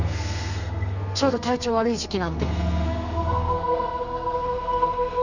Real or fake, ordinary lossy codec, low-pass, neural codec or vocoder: fake; none; 7.2 kHz; codec, 32 kHz, 1.9 kbps, SNAC